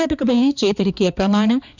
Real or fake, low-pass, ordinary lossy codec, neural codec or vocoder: fake; 7.2 kHz; none; codec, 16 kHz, 2 kbps, X-Codec, HuBERT features, trained on balanced general audio